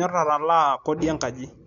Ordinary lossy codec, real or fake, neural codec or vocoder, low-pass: none; real; none; 7.2 kHz